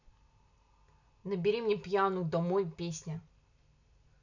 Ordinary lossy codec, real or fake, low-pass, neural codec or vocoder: none; real; 7.2 kHz; none